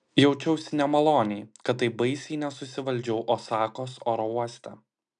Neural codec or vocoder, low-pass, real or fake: none; 9.9 kHz; real